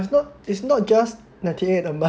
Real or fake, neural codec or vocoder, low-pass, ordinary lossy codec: real; none; none; none